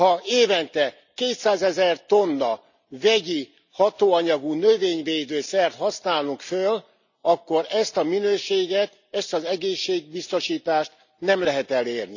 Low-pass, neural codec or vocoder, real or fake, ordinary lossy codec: 7.2 kHz; none; real; none